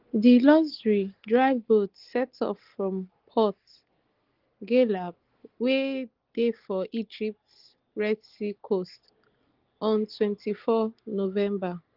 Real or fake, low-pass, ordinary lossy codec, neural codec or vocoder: real; 5.4 kHz; Opus, 16 kbps; none